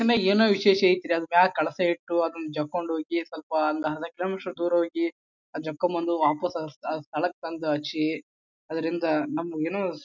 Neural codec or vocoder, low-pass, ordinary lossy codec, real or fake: none; 7.2 kHz; none; real